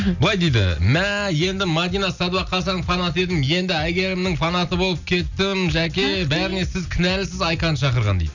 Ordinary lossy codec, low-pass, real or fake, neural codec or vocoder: none; 7.2 kHz; real; none